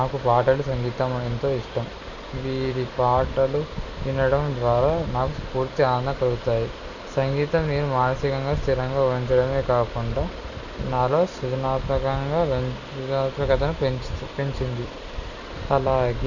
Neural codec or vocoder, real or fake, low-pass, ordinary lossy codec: none; real; 7.2 kHz; none